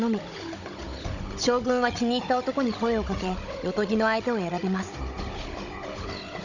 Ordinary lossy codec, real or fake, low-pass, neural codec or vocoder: none; fake; 7.2 kHz; codec, 16 kHz, 16 kbps, FunCodec, trained on Chinese and English, 50 frames a second